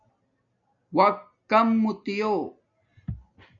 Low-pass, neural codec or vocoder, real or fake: 7.2 kHz; none; real